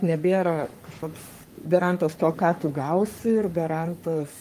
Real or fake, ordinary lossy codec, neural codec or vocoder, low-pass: fake; Opus, 32 kbps; codec, 44.1 kHz, 3.4 kbps, Pupu-Codec; 14.4 kHz